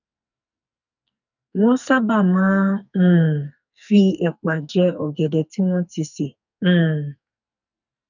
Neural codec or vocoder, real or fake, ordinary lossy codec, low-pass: codec, 44.1 kHz, 2.6 kbps, SNAC; fake; none; 7.2 kHz